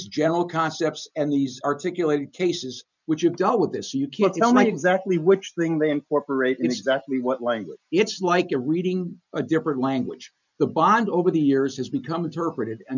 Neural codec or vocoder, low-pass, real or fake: none; 7.2 kHz; real